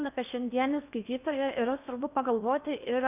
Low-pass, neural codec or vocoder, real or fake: 3.6 kHz; codec, 16 kHz in and 24 kHz out, 0.8 kbps, FocalCodec, streaming, 65536 codes; fake